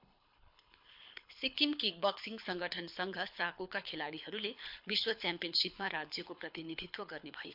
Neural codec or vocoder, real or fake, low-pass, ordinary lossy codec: codec, 24 kHz, 6 kbps, HILCodec; fake; 5.4 kHz; MP3, 48 kbps